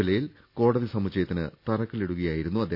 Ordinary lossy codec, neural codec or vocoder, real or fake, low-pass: none; none; real; 5.4 kHz